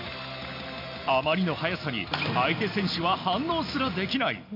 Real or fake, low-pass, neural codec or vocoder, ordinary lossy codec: real; 5.4 kHz; none; MP3, 48 kbps